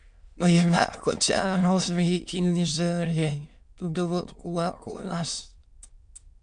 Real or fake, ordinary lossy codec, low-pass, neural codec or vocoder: fake; MP3, 64 kbps; 9.9 kHz; autoencoder, 22.05 kHz, a latent of 192 numbers a frame, VITS, trained on many speakers